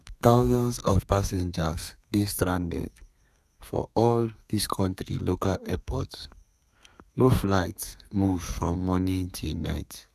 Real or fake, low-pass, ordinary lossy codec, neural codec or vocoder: fake; 14.4 kHz; none; codec, 32 kHz, 1.9 kbps, SNAC